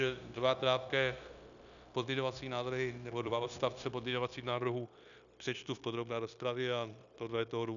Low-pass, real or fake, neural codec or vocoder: 7.2 kHz; fake; codec, 16 kHz, 0.9 kbps, LongCat-Audio-Codec